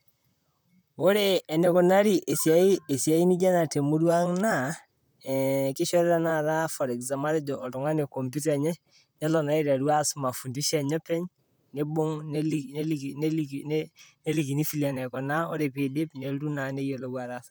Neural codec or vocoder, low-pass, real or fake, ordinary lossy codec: vocoder, 44.1 kHz, 128 mel bands, Pupu-Vocoder; none; fake; none